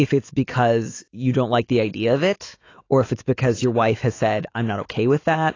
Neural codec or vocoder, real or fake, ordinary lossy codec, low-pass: autoencoder, 48 kHz, 128 numbers a frame, DAC-VAE, trained on Japanese speech; fake; AAC, 32 kbps; 7.2 kHz